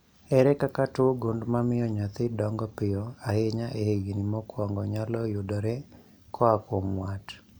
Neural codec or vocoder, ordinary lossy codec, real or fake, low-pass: none; none; real; none